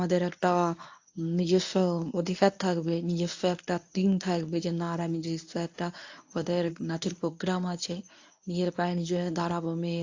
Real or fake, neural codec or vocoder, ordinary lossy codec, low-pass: fake; codec, 24 kHz, 0.9 kbps, WavTokenizer, medium speech release version 1; AAC, 48 kbps; 7.2 kHz